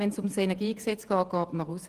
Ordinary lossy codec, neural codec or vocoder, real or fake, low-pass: Opus, 32 kbps; vocoder, 48 kHz, 128 mel bands, Vocos; fake; 14.4 kHz